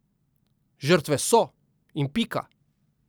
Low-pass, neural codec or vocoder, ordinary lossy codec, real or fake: none; none; none; real